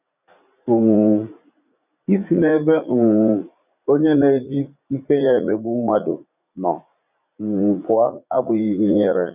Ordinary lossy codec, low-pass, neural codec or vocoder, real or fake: none; 3.6 kHz; vocoder, 44.1 kHz, 80 mel bands, Vocos; fake